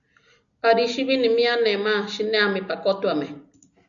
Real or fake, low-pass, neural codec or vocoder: real; 7.2 kHz; none